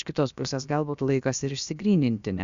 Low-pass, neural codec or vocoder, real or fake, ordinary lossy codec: 7.2 kHz; codec, 16 kHz, about 1 kbps, DyCAST, with the encoder's durations; fake; Opus, 64 kbps